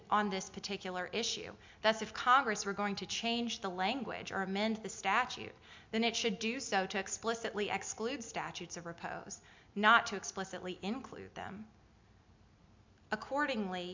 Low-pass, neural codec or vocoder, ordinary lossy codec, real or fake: 7.2 kHz; none; MP3, 64 kbps; real